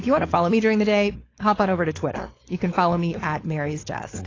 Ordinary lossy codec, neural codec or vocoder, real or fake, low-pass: AAC, 32 kbps; codec, 16 kHz, 4.8 kbps, FACodec; fake; 7.2 kHz